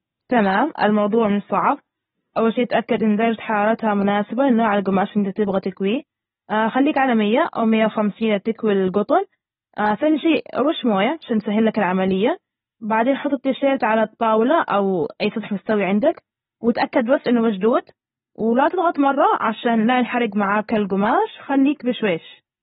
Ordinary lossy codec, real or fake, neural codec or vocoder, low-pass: AAC, 16 kbps; real; none; 7.2 kHz